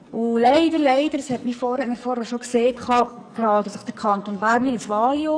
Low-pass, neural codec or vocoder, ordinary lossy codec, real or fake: 9.9 kHz; codec, 44.1 kHz, 2.6 kbps, SNAC; none; fake